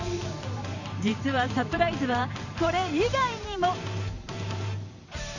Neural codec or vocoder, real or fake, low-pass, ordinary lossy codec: vocoder, 44.1 kHz, 128 mel bands every 512 samples, BigVGAN v2; fake; 7.2 kHz; none